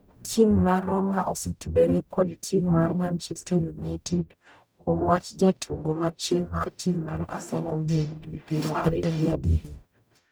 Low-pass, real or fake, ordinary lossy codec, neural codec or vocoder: none; fake; none; codec, 44.1 kHz, 0.9 kbps, DAC